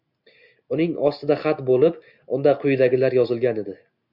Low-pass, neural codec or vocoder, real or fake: 5.4 kHz; none; real